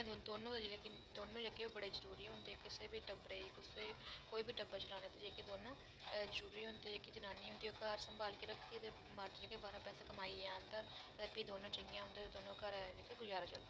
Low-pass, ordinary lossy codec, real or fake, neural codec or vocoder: none; none; fake; codec, 16 kHz, 16 kbps, FreqCodec, smaller model